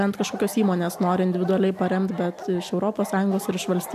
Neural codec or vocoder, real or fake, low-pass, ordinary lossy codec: none; real; 14.4 kHz; AAC, 96 kbps